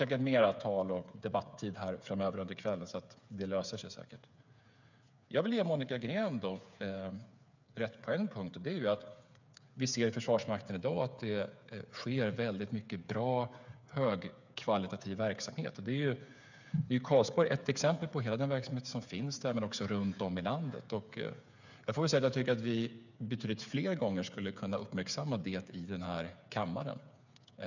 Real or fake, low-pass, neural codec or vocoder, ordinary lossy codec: fake; 7.2 kHz; codec, 16 kHz, 8 kbps, FreqCodec, smaller model; none